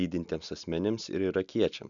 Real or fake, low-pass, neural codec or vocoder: real; 7.2 kHz; none